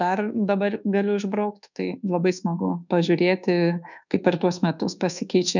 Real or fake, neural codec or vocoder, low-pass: fake; codec, 24 kHz, 1.2 kbps, DualCodec; 7.2 kHz